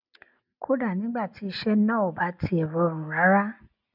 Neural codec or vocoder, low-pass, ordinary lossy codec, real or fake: none; 5.4 kHz; none; real